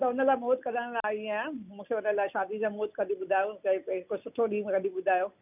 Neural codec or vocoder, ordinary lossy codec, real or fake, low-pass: none; none; real; 3.6 kHz